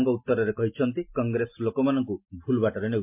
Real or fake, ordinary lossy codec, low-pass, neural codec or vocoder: real; MP3, 32 kbps; 3.6 kHz; none